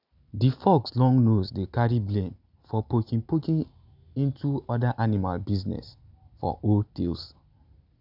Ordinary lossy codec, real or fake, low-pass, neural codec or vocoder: none; real; 5.4 kHz; none